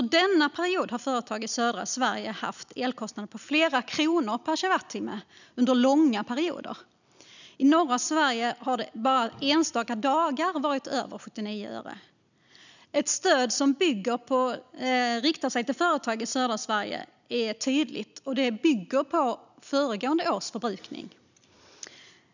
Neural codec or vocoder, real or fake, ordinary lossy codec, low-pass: none; real; none; 7.2 kHz